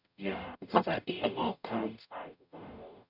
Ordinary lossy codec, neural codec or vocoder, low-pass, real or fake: none; codec, 44.1 kHz, 0.9 kbps, DAC; 5.4 kHz; fake